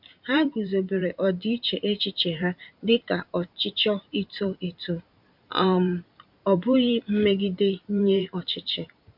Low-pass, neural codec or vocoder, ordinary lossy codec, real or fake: 5.4 kHz; vocoder, 44.1 kHz, 128 mel bands every 512 samples, BigVGAN v2; MP3, 48 kbps; fake